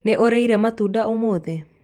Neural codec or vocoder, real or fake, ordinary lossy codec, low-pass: vocoder, 48 kHz, 128 mel bands, Vocos; fake; Opus, 32 kbps; 19.8 kHz